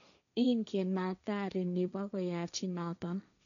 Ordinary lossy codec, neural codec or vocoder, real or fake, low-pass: none; codec, 16 kHz, 1.1 kbps, Voila-Tokenizer; fake; 7.2 kHz